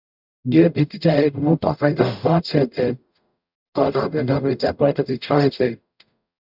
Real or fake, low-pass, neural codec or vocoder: fake; 5.4 kHz; codec, 44.1 kHz, 0.9 kbps, DAC